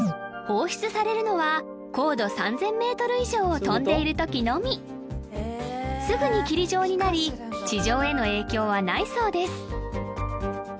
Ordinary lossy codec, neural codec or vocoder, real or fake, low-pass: none; none; real; none